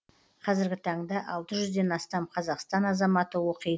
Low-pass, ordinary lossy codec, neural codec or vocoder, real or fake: none; none; none; real